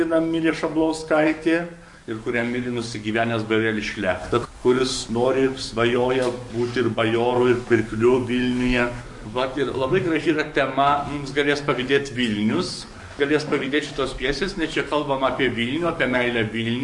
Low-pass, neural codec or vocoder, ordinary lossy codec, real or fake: 10.8 kHz; codec, 44.1 kHz, 7.8 kbps, DAC; MP3, 48 kbps; fake